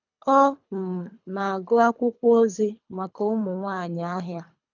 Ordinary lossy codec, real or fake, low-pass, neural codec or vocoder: none; fake; 7.2 kHz; codec, 24 kHz, 3 kbps, HILCodec